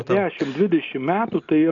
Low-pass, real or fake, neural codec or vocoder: 7.2 kHz; real; none